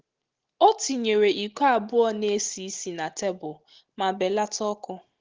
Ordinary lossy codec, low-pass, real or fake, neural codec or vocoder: Opus, 16 kbps; 7.2 kHz; real; none